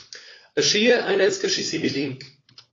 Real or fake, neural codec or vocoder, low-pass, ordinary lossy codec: fake; codec, 16 kHz, 4 kbps, FunCodec, trained on LibriTTS, 50 frames a second; 7.2 kHz; AAC, 32 kbps